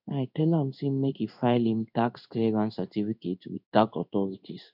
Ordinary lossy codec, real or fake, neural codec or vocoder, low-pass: none; fake; codec, 16 kHz in and 24 kHz out, 1 kbps, XY-Tokenizer; 5.4 kHz